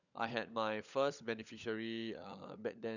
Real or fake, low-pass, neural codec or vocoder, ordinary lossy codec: fake; 7.2 kHz; codec, 16 kHz, 16 kbps, FunCodec, trained on LibriTTS, 50 frames a second; none